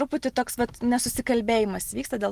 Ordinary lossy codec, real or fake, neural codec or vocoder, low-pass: Opus, 24 kbps; real; none; 14.4 kHz